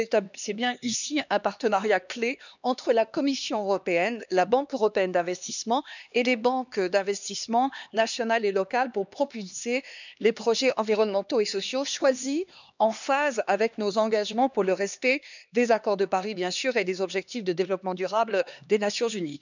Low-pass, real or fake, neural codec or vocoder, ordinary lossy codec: 7.2 kHz; fake; codec, 16 kHz, 2 kbps, X-Codec, HuBERT features, trained on LibriSpeech; none